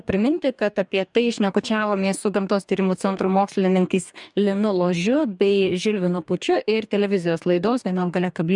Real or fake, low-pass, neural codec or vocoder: fake; 10.8 kHz; codec, 44.1 kHz, 2.6 kbps, DAC